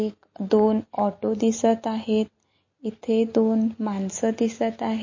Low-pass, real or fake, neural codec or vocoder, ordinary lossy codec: 7.2 kHz; real; none; MP3, 32 kbps